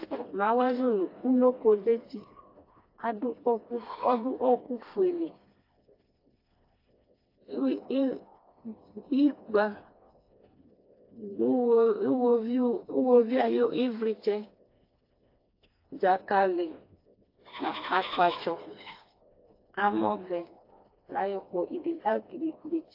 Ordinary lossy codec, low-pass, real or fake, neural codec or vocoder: AAC, 32 kbps; 5.4 kHz; fake; codec, 16 kHz, 2 kbps, FreqCodec, smaller model